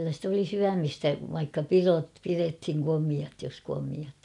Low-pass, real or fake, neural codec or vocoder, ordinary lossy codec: 10.8 kHz; real; none; none